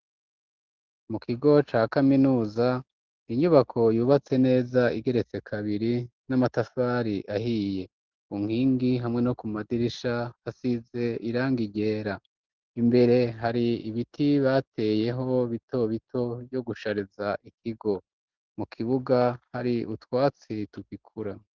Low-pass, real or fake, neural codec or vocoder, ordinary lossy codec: 7.2 kHz; real; none; Opus, 16 kbps